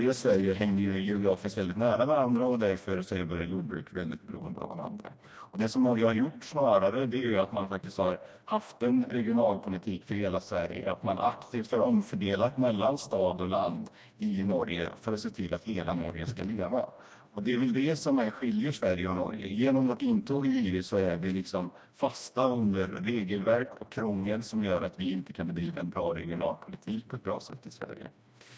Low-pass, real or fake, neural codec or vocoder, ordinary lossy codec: none; fake; codec, 16 kHz, 1 kbps, FreqCodec, smaller model; none